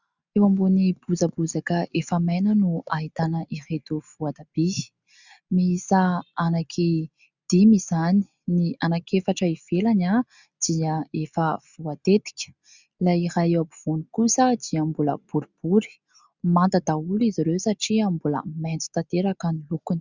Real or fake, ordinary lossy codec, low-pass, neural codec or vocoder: real; Opus, 64 kbps; 7.2 kHz; none